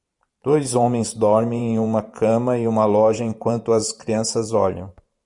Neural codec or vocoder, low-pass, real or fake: vocoder, 44.1 kHz, 128 mel bands every 512 samples, BigVGAN v2; 10.8 kHz; fake